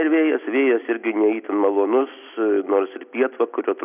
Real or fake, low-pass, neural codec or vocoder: real; 3.6 kHz; none